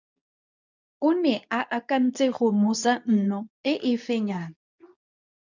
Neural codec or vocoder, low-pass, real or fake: codec, 24 kHz, 0.9 kbps, WavTokenizer, medium speech release version 2; 7.2 kHz; fake